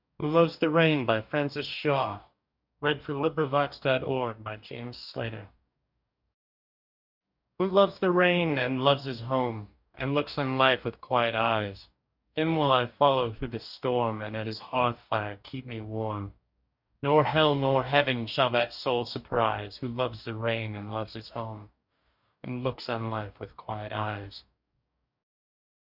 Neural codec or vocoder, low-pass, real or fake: codec, 44.1 kHz, 2.6 kbps, DAC; 5.4 kHz; fake